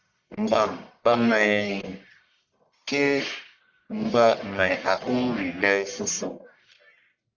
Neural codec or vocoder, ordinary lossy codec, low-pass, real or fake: codec, 44.1 kHz, 1.7 kbps, Pupu-Codec; Opus, 64 kbps; 7.2 kHz; fake